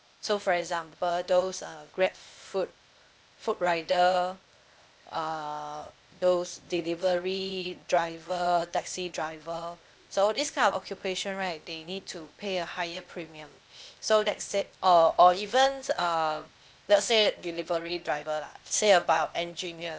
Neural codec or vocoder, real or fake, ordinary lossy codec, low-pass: codec, 16 kHz, 0.8 kbps, ZipCodec; fake; none; none